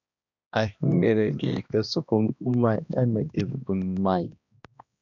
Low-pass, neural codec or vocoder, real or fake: 7.2 kHz; codec, 16 kHz, 1 kbps, X-Codec, HuBERT features, trained on balanced general audio; fake